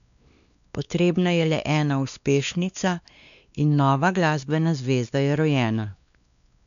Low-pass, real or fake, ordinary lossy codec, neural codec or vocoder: 7.2 kHz; fake; none; codec, 16 kHz, 2 kbps, X-Codec, WavLM features, trained on Multilingual LibriSpeech